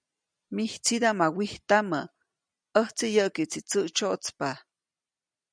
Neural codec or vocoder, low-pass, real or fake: none; 9.9 kHz; real